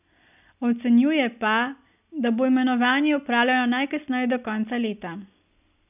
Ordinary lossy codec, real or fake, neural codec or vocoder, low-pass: none; real; none; 3.6 kHz